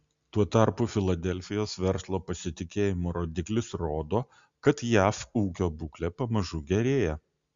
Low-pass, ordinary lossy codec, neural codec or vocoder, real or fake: 7.2 kHz; Opus, 64 kbps; none; real